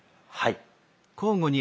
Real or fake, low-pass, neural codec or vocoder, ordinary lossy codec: real; none; none; none